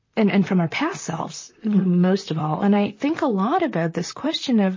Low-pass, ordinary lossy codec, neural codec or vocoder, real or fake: 7.2 kHz; MP3, 32 kbps; vocoder, 44.1 kHz, 128 mel bands, Pupu-Vocoder; fake